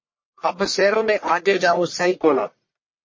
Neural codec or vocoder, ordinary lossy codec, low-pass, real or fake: codec, 44.1 kHz, 1.7 kbps, Pupu-Codec; MP3, 32 kbps; 7.2 kHz; fake